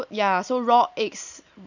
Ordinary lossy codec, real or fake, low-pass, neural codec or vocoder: none; real; 7.2 kHz; none